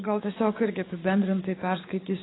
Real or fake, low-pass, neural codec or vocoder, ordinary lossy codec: fake; 7.2 kHz; codec, 16 kHz, 6 kbps, DAC; AAC, 16 kbps